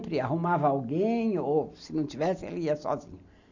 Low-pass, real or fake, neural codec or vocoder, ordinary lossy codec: 7.2 kHz; real; none; none